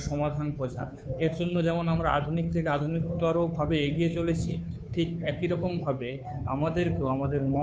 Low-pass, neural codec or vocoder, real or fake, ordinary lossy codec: none; codec, 16 kHz, 2 kbps, FunCodec, trained on Chinese and English, 25 frames a second; fake; none